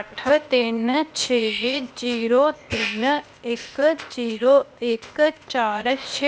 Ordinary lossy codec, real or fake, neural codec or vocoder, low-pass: none; fake; codec, 16 kHz, 0.8 kbps, ZipCodec; none